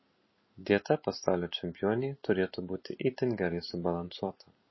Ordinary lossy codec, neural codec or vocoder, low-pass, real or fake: MP3, 24 kbps; vocoder, 44.1 kHz, 128 mel bands every 256 samples, BigVGAN v2; 7.2 kHz; fake